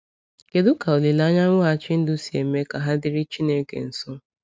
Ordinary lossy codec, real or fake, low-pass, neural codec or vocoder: none; real; none; none